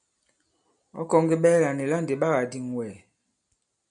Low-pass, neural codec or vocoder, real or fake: 9.9 kHz; none; real